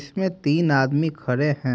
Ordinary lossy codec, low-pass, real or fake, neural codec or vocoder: none; none; real; none